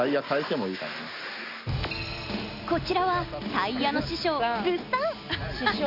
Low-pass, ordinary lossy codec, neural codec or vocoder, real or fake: 5.4 kHz; none; none; real